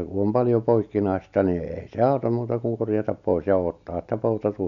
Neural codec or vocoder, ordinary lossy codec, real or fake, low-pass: none; none; real; 7.2 kHz